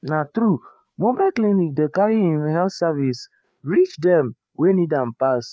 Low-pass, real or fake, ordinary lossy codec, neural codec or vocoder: none; fake; none; codec, 16 kHz, 4 kbps, FreqCodec, larger model